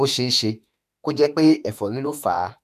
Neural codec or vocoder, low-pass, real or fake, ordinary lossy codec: autoencoder, 48 kHz, 32 numbers a frame, DAC-VAE, trained on Japanese speech; 14.4 kHz; fake; none